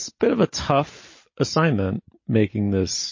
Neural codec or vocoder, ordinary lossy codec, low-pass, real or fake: none; MP3, 32 kbps; 7.2 kHz; real